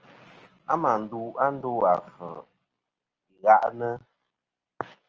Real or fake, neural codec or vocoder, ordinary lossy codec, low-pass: real; none; Opus, 24 kbps; 7.2 kHz